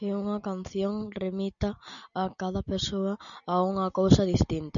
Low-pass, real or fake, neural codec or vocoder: 7.2 kHz; real; none